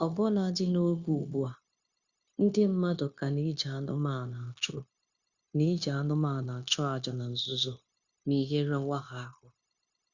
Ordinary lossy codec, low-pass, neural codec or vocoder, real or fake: Opus, 64 kbps; 7.2 kHz; codec, 16 kHz, 0.9 kbps, LongCat-Audio-Codec; fake